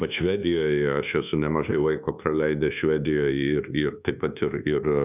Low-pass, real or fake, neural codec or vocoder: 3.6 kHz; fake; codec, 24 kHz, 1.2 kbps, DualCodec